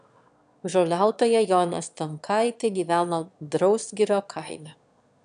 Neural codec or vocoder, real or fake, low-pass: autoencoder, 22.05 kHz, a latent of 192 numbers a frame, VITS, trained on one speaker; fake; 9.9 kHz